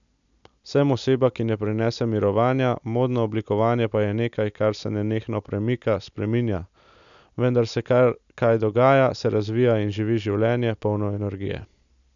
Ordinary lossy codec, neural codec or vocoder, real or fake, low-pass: none; none; real; 7.2 kHz